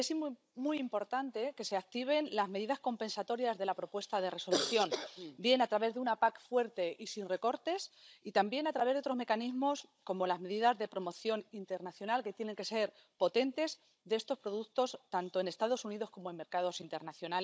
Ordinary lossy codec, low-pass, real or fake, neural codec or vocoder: none; none; fake; codec, 16 kHz, 16 kbps, FunCodec, trained on Chinese and English, 50 frames a second